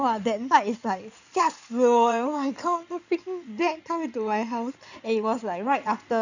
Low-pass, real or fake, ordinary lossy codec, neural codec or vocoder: 7.2 kHz; fake; none; codec, 16 kHz in and 24 kHz out, 2.2 kbps, FireRedTTS-2 codec